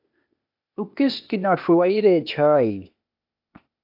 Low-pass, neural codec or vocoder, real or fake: 5.4 kHz; codec, 16 kHz, 0.8 kbps, ZipCodec; fake